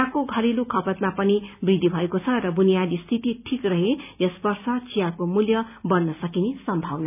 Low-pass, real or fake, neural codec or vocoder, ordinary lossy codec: 3.6 kHz; real; none; none